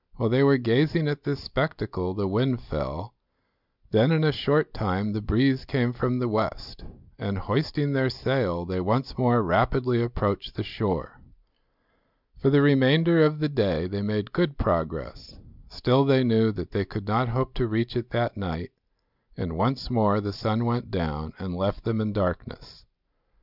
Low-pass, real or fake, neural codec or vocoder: 5.4 kHz; real; none